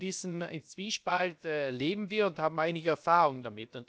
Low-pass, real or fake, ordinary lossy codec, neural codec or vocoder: none; fake; none; codec, 16 kHz, about 1 kbps, DyCAST, with the encoder's durations